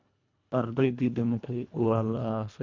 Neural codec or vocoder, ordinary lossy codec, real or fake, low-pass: codec, 24 kHz, 1.5 kbps, HILCodec; MP3, 64 kbps; fake; 7.2 kHz